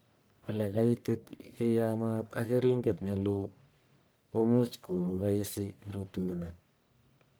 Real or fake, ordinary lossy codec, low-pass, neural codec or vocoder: fake; none; none; codec, 44.1 kHz, 1.7 kbps, Pupu-Codec